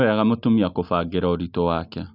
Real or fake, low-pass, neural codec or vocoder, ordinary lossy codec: real; 5.4 kHz; none; none